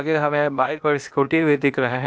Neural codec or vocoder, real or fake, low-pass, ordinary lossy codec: codec, 16 kHz, 0.8 kbps, ZipCodec; fake; none; none